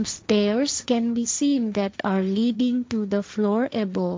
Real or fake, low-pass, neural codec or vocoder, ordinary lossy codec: fake; none; codec, 16 kHz, 1.1 kbps, Voila-Tokenizer; none